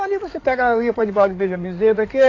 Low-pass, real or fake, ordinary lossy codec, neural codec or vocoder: 7.2 kHz; fake; AAC, 32 kbps; codec, 16 kHz in and 24 kHz out, 2.2 kbps, FireRedTTS-2 codec